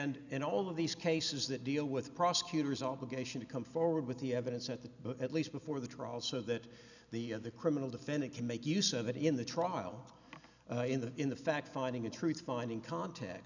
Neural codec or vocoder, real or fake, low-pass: none; real; 7.2 kHz